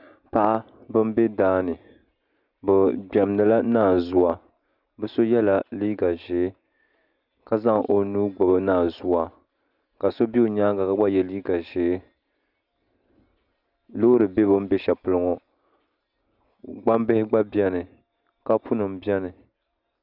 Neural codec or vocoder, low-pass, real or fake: none; 5.4 kHz; real